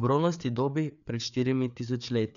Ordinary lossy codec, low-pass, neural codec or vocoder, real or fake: none; 7.2 kHz; codec, 16 kHz, 4 kbps, FreqCodec, larger model; fake